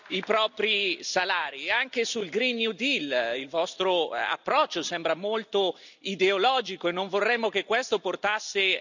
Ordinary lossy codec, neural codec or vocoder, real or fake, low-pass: none; none; real; 7.2 kHz